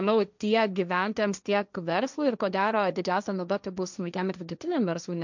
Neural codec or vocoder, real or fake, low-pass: codec, 16 kHz, 1.1 kbps, Voila-Tokenizer; fake; 7.2 kHz